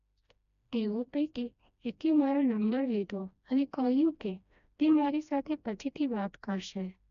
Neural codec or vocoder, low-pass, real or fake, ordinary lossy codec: codec, 16 kHz, 1 kbps, FreqCodec, smaller model; 7.2 kHz; fake; none